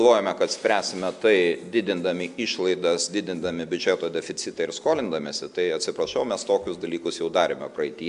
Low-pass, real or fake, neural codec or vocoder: 10.8 kHz; real; none